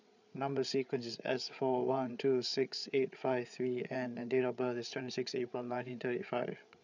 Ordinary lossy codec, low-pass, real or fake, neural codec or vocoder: none; 7.2 kHz; fake; codec, 16 kHz, 8 kbps, FreqCodec, larger model